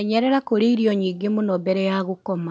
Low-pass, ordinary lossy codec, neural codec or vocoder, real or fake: none; none; none; real